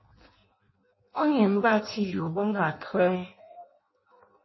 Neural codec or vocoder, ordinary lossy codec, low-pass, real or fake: codec, 16 kHz in and 24 kHz out, 0.6 kbps, FireRedTTS-2 codec; MP3, 24 kbps; 7.2 kHz; fake